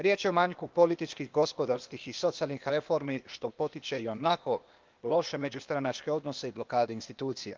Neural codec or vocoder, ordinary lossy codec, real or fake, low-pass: codec, 16 kHz, 0.8 kbps, ZipCodec; Opus, 32 kbps; fake; 7.2 kHz